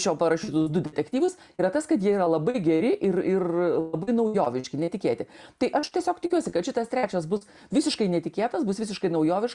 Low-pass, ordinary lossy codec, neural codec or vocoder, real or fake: 10.8 kHz; Opus, 64 kbps; none; real